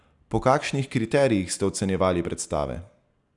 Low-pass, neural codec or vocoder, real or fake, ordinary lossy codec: 10.8 kHz; none; real; none